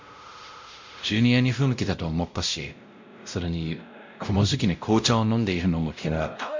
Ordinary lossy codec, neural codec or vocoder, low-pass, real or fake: MP3, 64 kbps; codec, 16 kHz, 0.5 kbps, X-Codec, WavLM features, trained on Multilingual LibriSpeech; 7.2 kHz; fake